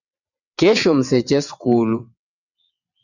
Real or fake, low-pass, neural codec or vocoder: fake; 7.2 kHz; vocoder, 22.05 kHz, 80 mel bands, WaveNeXt